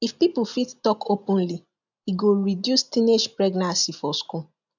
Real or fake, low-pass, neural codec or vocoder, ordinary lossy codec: real; 7.2 kHz; none; none